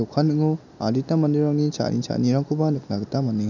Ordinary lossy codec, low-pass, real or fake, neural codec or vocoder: none; 7.2 kHz; real; none